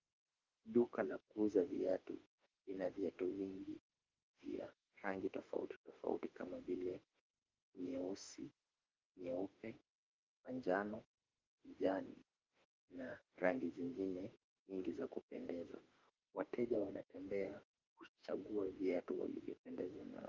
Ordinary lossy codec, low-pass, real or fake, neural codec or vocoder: Opus, 32 kbps; 7.2 kHz; fake; autoencoder, 48 kHz, 32 numbers a frame, DAC-VAE, trained on Japanese speech